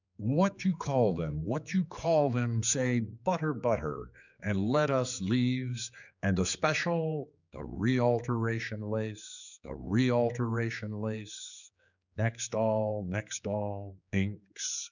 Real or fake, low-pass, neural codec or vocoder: fake; 7.2 kHz; codec, 16 kHz, 4 kbps, X-Codec, HuBERT features, trained on general audio